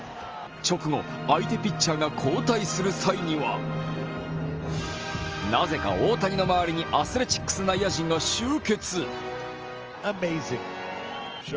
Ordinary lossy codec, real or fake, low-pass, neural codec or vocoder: Opus, 24 kbps; real; 7.2 kHz; none